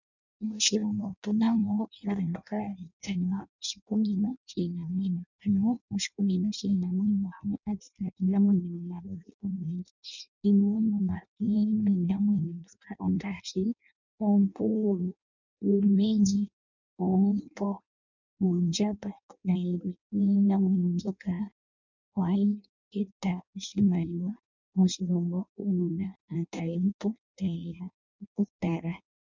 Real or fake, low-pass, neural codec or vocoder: fake; 7.2 kHz; codec, 16 kHz in and 24 kHz out, 0.6 kbps, FireRedTTS-2 codec